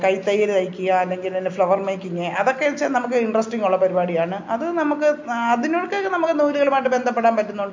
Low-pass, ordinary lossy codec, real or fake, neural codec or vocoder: 7.2 kHz; MP3, 48 kbps; real; none